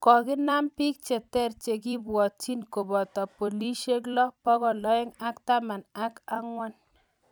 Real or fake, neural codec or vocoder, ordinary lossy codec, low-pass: fake; vocoder, 44.1 kHz, 128 mel bands every 256 samples, BigVGAN v2; none; none